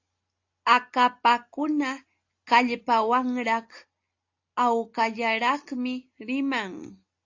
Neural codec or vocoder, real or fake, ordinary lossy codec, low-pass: none; real; AAC, 48 kbps; 7.2 kHz